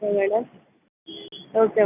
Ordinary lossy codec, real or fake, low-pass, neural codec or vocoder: none; real; 3.6 kHz; none